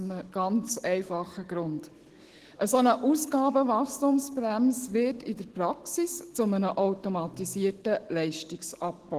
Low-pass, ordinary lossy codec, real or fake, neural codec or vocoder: 14.4 kHz; Opus, 16 kbps; fake; autoencoder, 48 kHz, 128 numbers a frame, DAC-VAE, trained on Japanese speech